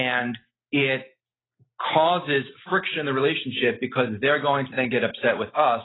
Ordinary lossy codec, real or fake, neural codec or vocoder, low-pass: AAC, 16 kbps; real; none; 7.2 kHz